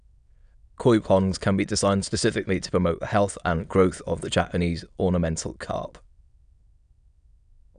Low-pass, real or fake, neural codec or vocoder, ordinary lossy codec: 9.9 kHz; fake; autoencoder, 22.05 kHz, a latent of 192 numbers a frame, VITS, trained on many speakers; none